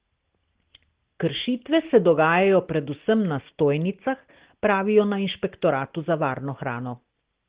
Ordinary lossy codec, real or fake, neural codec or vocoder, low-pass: Opus, 16 kbps; real; none; 3.6 kHz